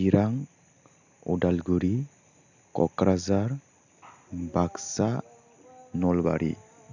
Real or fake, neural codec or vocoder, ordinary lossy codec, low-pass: real; none; none; 7.2 kHz